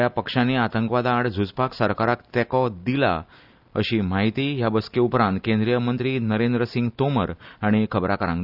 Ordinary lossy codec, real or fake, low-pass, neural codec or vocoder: none; real; 5.4 kHz; none